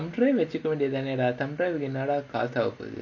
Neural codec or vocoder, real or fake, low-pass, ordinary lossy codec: none; real; 7.2 kHz; none